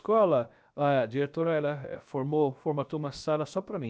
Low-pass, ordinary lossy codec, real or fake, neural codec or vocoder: none; none; fake; codec, 16 kHz, about 1 kbps, DyCAST, with the encoder's durations